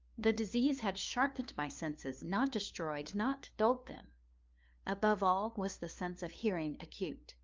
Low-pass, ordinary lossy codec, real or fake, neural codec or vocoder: 7.2 kHz; Opus, 24 kbps; fake; codec, 16 kHz, 2 kbps, FunCodec, trained on LibriTTS, 25 frames a second